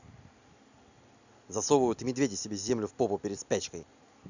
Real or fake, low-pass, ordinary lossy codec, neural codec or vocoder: real; 7.2 kHz; none; none